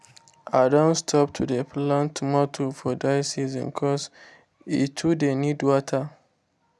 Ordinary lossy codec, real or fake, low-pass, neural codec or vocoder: none; real; none; none